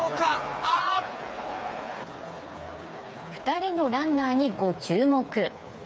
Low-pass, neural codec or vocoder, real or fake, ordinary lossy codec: none; codec, 16 kHz, 4 kbps, FreqCodec, smaller model; fake; none